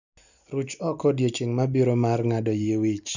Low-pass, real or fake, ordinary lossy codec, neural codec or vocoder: 7.2 kHz; real; none; none